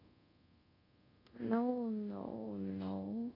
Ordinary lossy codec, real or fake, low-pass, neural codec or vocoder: AAC, 32 kbps; fake; 5.4 kHz; codec, 24 kHz, 0.5 kbps, DualCodec